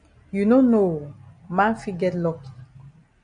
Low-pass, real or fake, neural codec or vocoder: 9.9 kHz; real; none